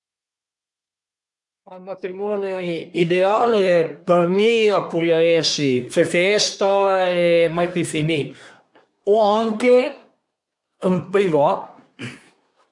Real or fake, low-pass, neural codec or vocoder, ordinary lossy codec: fake; 10.8 kHz; codec, 24 kHz, 1 kbps, SNAC; none